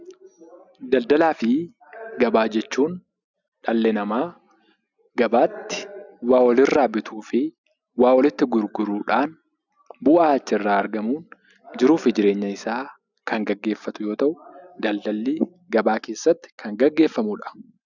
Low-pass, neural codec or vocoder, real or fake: 7.2 kHz; none; real